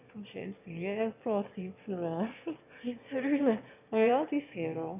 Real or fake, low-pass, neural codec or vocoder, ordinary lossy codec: fake; 3.6 kHz; autoencoder, 22.05 kHz, a latent of 192 numbers a frame, VITS, trained on one speaker; none